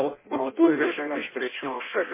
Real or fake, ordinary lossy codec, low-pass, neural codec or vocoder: fake; MP3, 16 kbps; 3.6 kHz; codec, 16 kHz in and 24 kHz out, 0.6 kbps, FireRedTTS-2 codec